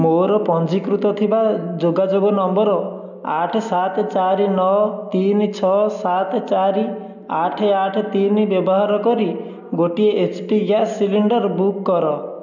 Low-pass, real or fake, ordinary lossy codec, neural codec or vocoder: 7.2 kHz; real; none; none